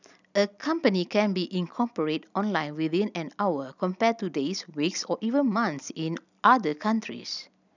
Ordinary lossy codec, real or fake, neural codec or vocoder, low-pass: none; real; none; 7.2 kHz